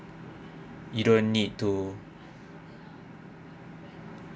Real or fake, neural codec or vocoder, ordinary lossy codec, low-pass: real; none; none; none